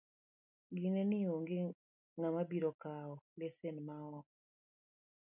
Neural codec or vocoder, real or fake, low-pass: none; real; 3.6 kHz